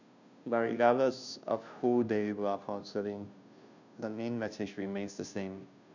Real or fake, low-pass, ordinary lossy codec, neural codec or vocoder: fake; 7.2 kHz; none; codec, 16 kHz, 0.5 kbps, FunCodec, trained on Chinese and English, 25 frames a second